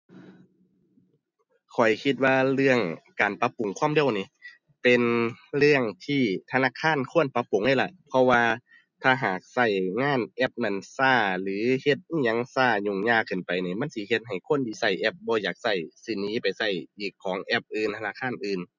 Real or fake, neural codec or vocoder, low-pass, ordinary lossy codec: real; none; 7.2 kHz; none